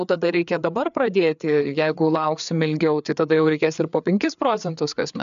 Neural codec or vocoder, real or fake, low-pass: codec, 16 kHz, 4 kbps, FreqCodec, larger model; fake; 7.2 kHz